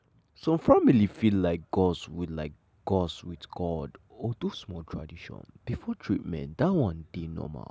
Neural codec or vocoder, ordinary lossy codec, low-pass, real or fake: none; none; none; real